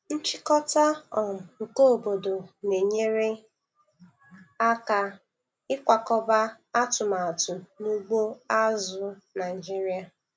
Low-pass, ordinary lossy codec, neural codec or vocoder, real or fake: none; none; none; real